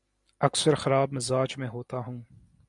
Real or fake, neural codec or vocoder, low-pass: real; none; 10.8 kHz